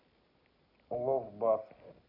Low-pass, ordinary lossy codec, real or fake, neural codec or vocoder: 5.4 kHz; none; real; none